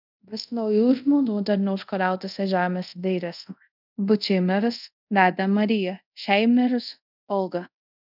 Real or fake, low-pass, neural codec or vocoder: fake; 5.4 kHz; codec, 24 kHz, 0.5 kbps, DualCodec